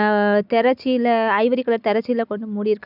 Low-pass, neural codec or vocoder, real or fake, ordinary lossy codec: 5.4 kHz; none; real; none